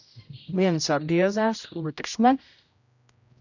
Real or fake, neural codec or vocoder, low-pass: fake; codec, 16 kHz, 0.5 kbps, X-Codec, HuBERT features, trained on general audio; 7.2 kHz